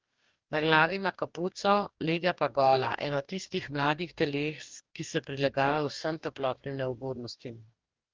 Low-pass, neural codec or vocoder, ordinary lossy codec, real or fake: 7.2 kHz; codec, 44.1 kHz, 2.6 kbps, DAC; Opus, 32 kbps; fake